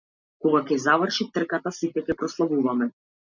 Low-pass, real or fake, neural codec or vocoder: 7.2 kHz; real; none